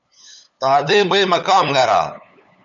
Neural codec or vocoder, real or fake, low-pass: codec, 16 kHz, 8 kbps, FunCodec, trained on LibriTTS, 25 frames a second; fake; 7.2 kHz